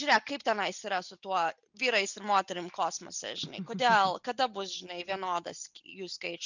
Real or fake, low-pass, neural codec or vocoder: real; 7.2 kHz; none